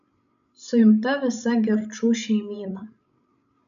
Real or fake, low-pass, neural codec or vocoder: fake; 7.2 kHz; codec, 16 kHz, 16 kbps, FreqCodec, larger model